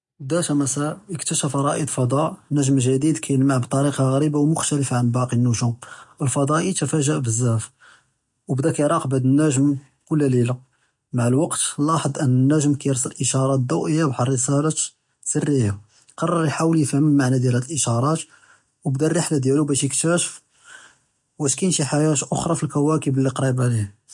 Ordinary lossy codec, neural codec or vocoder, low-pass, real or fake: MP3, 48 kbps; none; 10.8 kHz; real